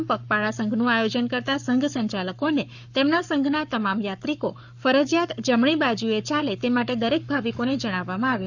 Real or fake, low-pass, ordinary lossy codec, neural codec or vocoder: fake; 7.2 kHz; none; codec, 44.1 kHz, 7.8 kbps, Pupu-Codec